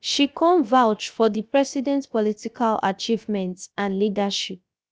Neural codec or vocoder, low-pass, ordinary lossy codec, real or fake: codec, 16 kHz, 0.7 kbps, FocalCodec; none; none; fake